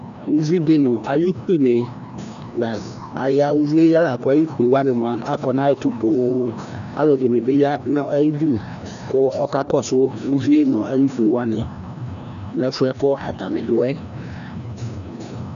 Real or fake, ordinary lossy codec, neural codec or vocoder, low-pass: fake; MP3, 96 kbps; codec, 16 kHz, 1 kbps, FreqCodec, larger model; 7.2 kHz